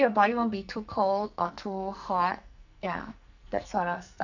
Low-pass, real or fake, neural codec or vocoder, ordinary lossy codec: 7.2 kHz; fake; codec, 44.1 kHz, 2.6 kbps, SNAC; none